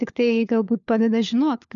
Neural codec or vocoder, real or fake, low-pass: codec, 16 kHz, 4 kbps, FunCodec, trained on LibriTTS, 50 frames a second; fake; 7.2 kHz